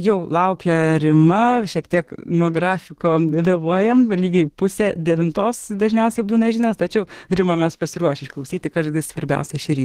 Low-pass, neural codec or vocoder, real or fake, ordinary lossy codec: 14.4 kHz; codec, 32 kHz, 1.9 kbps, SNAC; fake; Opus, 16 kbps